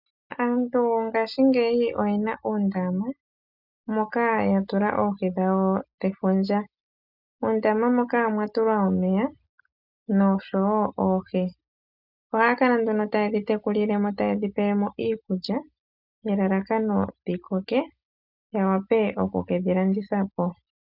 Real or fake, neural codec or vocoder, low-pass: real; none; 5.4 kHz